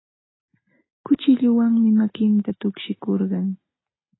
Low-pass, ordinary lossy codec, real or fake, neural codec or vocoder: 7.2 kHz; AAC, 16 kbps; real; none